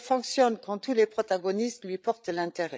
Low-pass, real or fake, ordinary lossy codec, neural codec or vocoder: none; fake; none; codec, 16 kHz, 8 kbps, FreqCodec, larger model